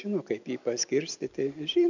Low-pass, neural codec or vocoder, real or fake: 7.2 kHz; none; real